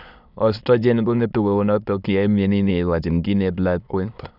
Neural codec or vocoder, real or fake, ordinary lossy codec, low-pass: autoencoder, 22.05 kHz, a latent of 192 numbers a frame, VITS, trained on many speakers; fake; none; 5.4 kHz